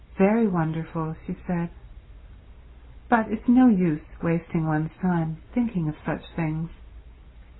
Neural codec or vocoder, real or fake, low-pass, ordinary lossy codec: none; real; 7.2 kHz; AAC, 16 kbps